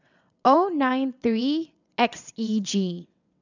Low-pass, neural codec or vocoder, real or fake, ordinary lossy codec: 7.2 kHz; vocoder, 22.05 kHz, 80 mel bands, WaveNeXt; fake; none